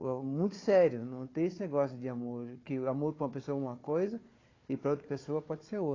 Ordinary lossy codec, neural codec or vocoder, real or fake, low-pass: AAC, 32 kbps; codec, 16 kHz, 4 kbps, FunCodec, trained on Chinese and English, 50 frames a second; fake; 7.2 kHz